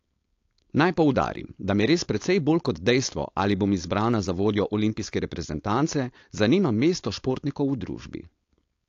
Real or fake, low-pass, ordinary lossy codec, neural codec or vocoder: fake; 7.2 kHz; AAC, 48 kbps; codec, 16 kHz, 4.8 kbps, FACodec